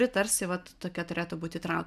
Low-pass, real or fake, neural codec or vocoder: 14.4 kHz; real; none